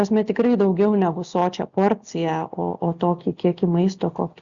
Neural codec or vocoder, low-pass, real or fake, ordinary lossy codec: none; 7.2 kHz; real; Opus, 64 kbps